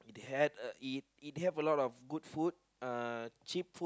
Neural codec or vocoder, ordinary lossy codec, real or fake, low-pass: none; none; real; none